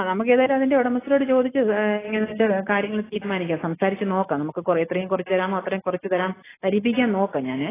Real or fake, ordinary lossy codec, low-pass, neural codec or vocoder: real; AAC, 16 kbps; 3.6 kHz; none